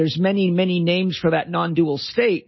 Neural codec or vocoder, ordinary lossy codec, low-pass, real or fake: none; MP3, 24 kbps; 7.2 kHz; real